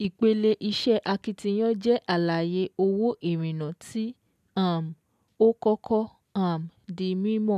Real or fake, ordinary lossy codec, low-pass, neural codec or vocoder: real; none; 14.4 kHz; none